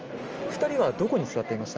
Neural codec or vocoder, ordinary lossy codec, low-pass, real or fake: none; Opus, 24 kbps; 7.2 kHz; real